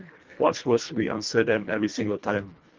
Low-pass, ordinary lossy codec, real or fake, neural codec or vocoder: 7.2 kHz; Opus, 24 kbps; fake; codec, 24 kHz, 1.5 kbps, HILCodec